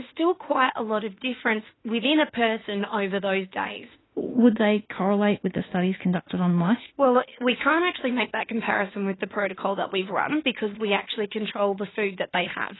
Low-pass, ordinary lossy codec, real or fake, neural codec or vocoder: 7.2 kHz; AAC, 16 kbps; fake; autoencoder, 48 kHz, 32 numbers a frame, DAC-VAE, trained on Japanese speech